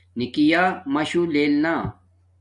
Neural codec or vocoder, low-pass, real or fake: none; 10.8 kHz; real